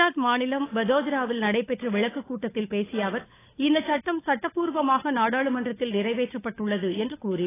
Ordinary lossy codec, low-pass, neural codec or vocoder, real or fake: AAC, 16 kbps; 3.6 kHz; codec, 16 kHz, 16 kbps, FunCodec, trained on LibriTTS, 50 frames a second; fake